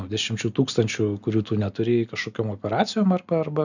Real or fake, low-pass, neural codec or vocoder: real; 7.2 kHz; none